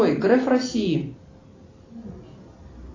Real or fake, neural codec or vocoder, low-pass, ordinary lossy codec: real; none; 7.2 kHz; AAC, 32 kbps